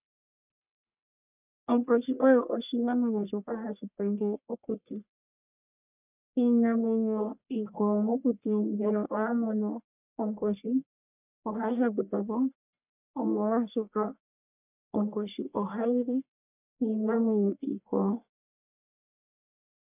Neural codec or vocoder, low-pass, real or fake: codec, 44.1 kHz, 1.7 kbps, Pupu-Codec; 3.6 kHz; fake